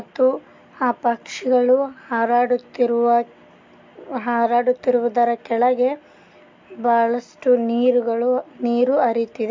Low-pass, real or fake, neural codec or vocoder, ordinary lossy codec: 7.2 kHz; real; none; MP3, 48 kbps